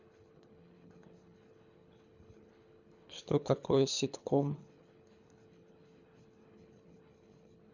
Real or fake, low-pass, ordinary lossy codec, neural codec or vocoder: fake; 7.2 kHz; none; codec, 24 kHz, 3 kbps, HILCodec